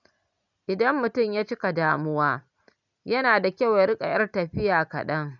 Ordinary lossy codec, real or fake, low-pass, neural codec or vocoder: none; real; 7.2 kHz; none